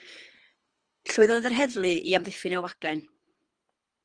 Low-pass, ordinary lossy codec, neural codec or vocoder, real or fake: 9.9 kHz; Opus, 24 kbps; codec, 24 kHz, 3 kbps, HILCodec; fake